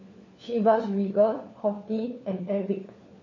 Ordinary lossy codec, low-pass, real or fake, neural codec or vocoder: MP3, 32 kbps; 7.2 kHz; fake; codec, 16 kHz, 4 kbps, FunCodec, trained on LibriTTS, 50 frames a second